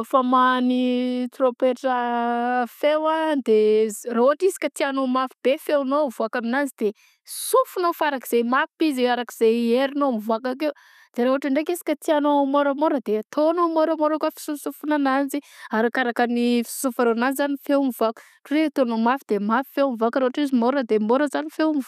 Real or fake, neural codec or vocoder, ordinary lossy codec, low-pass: real; none; none; 14.4 kHz